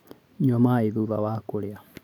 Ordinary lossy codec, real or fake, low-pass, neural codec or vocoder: none; real; 19.8 kHz; none